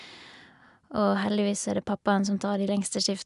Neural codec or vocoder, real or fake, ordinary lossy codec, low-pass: none; real; none; 10.8 kHz